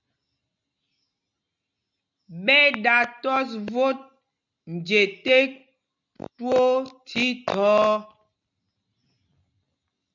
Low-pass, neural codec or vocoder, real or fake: 7.2 kHz; none; real